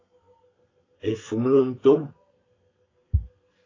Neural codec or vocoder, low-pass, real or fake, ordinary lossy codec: codec, 32 kHz, 1.9 kbps, SNAC; 7.2 kHz; fake; AAC, 32 kbps